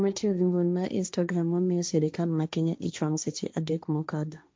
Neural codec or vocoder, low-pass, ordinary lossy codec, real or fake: codec, 16 kHz, 1.1 kbps, Voila-Tokenizer; none; none; fake